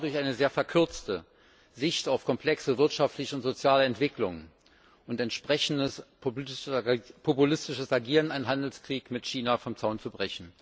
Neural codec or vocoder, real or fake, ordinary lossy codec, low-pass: none; real; none; none